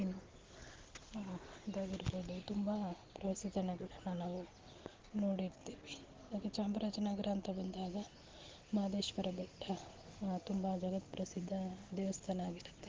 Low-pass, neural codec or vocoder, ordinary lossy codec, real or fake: 7.2 kHz; none; Opus, 16 kbps; real